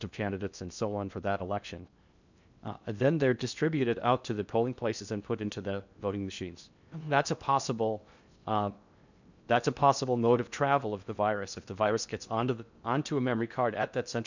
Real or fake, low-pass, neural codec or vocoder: fake; 7.2 kHz; codec, 16 kHz in and 24 kHz out, 0.6 kbps, FocalCodec, streaming, 2048 codes